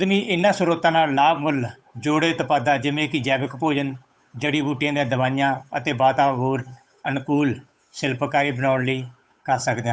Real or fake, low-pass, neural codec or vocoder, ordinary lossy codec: fake; none; codec, 16 kHz, 8 kbps, FunCodec, trained on Chinese and English, 25 frames a second; none